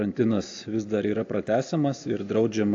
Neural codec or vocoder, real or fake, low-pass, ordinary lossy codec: none; real; 7.2 kHz; AAC, 48 kbps